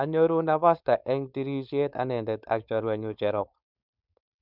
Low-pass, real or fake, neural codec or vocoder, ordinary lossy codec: 5.4 kHz; fake; codec, 16 kHz, 4.8 kbps, FACodec; none